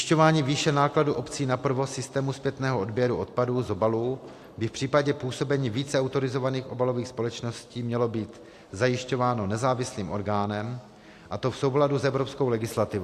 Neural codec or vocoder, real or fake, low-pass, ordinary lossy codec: none; real; 14.4 kHz; AAC, 64 kbps